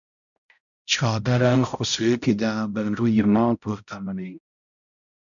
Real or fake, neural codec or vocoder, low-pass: fake; codec, 16 kHz, 0.5 kbps, X-Codec, HuBERT features, trained on balanced general audio; 7.2 kHz